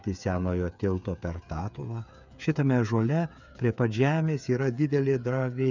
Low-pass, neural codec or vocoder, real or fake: 7.2 kHz; codec, 16 kHz, 8 kbps, FreqCodec, smaller model; fake